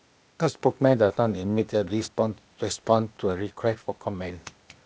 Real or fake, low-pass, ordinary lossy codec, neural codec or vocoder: fake; none; none; codec, 16 kHz, 0.8 kbps, ZipCodec